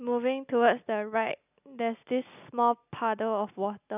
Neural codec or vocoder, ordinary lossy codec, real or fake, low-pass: none; none; real; 3.6 kHz